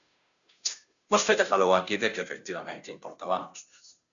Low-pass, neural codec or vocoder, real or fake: 7.2 kHz; codec, 16 kHz, 0.5 kbps, FunCodec, trained on Chinese and English, 25 frames a second; fake